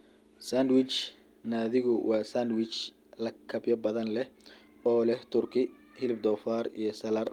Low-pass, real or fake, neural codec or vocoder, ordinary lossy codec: 19.8 kHz; real; none; Opus, 32 kbps